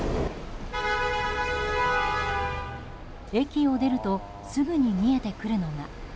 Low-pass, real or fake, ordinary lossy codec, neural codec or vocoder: none; real; none; none